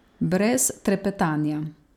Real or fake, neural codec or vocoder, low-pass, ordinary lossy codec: real; none; 19.8 kHz; none